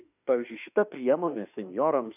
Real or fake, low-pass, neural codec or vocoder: fake; 3.6 kHz; autoencoder, 48 kHz, 32 numbers a frame, DAC-VAE, trained on Japanese speech